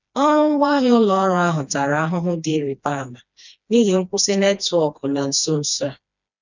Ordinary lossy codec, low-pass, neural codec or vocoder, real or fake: none; 7.2 kHz; codec, 16 kHz, 2 kbps, FreqCodec, smaller model; fake